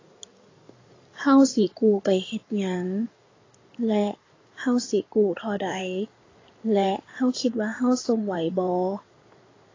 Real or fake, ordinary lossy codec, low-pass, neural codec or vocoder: fake; AAC, 32 kbps; 7.2 kHz; codec, 16 kHz in and 24 kHz out, 2.2 kbps, FireRedTTS-2 codec